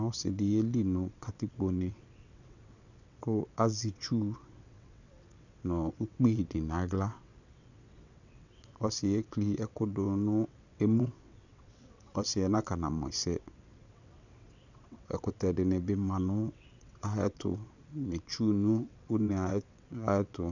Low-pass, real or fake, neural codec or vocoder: 7.2 kHz; fake; vocoder, 22.05 kHz, 80 mel bands, WaveNeXt